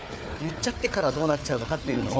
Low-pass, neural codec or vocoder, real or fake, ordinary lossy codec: none; codec, 16 kHz, 4 kbps, FunCodec, trained on Chinese and English, 50 frames a second; fake; none